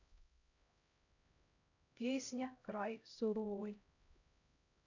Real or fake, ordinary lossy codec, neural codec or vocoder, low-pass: fake; none; codec, 16 kHz, 0.5 kbps, X-Codec, HuBERT features, trained on LibriSpeech; 7.2 kHz